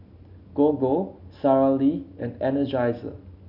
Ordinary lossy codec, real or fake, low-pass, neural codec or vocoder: none; real; 5.4 kHz; none